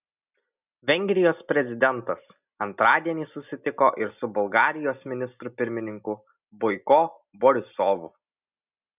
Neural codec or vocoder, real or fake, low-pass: none; real; 3.6 kHz